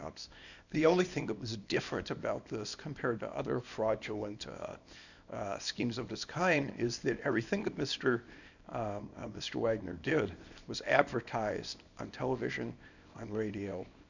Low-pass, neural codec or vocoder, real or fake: 7.2 kHz; codec, 24 kHz, 0.9 kbps, WavTokenizer, small release; fake